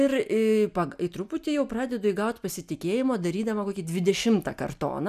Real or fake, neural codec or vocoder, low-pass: real; none; 14.4 kHz